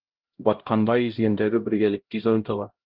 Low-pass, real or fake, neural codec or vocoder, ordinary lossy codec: 5.4 kHz; fake; codec, 16 kHz, 0.5 kbps, X-Codec, HuBERT features, trained on LibriSpeech; Opus, 16 kbps